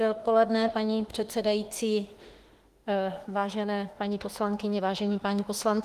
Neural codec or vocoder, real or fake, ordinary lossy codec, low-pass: autoencoder, 48 kHz, 32 numbers a frame, DAC-VAE, trained on Japanese speech; fake; Opus, 24 kbps; 14.4 kHz